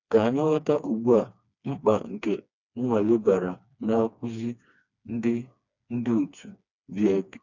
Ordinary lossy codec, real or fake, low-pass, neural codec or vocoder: none; fake; 7.2 kHz; codec, 16 kHz, 2 kbps, FreqCodec, smaller model